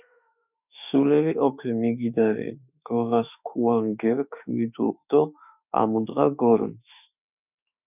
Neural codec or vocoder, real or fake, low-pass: autoencoder, 48 kHz, 32 numbers a frame, DAC-VAE, trained on Japanese speech; fake; 3.6 kHz